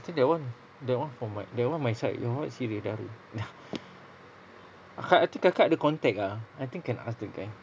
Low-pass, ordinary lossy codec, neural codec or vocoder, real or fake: none; none; none; real